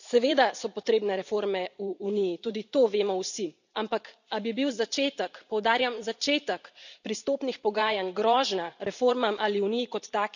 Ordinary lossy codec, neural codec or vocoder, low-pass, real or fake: none; vocoder, 44.1 kHz, 128 mel bands every 512 samples, BigVGAN v2; 7.2 kHz; fake